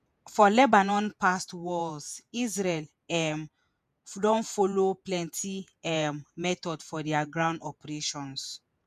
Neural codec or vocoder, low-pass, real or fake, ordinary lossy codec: vocoder, 48 kHz, 128 mel bands, Vocos; 14.4 kHz; fake; none